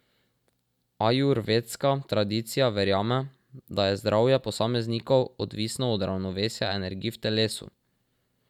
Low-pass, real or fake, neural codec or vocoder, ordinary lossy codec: 19.8 kHz; real; none; none